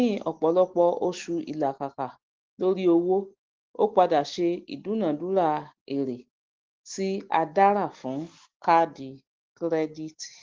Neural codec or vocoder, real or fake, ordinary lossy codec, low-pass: none; real; Opus, 16 kbps; 7.2 kHz